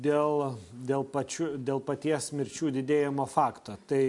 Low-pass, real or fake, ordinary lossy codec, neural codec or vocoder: 10.8 kHz; real; MP3, 64 kbps; none